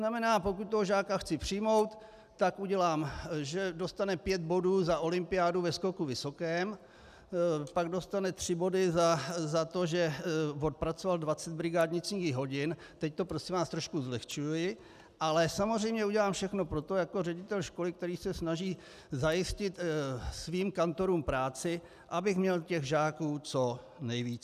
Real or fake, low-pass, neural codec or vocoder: real; 14.4 kHz; none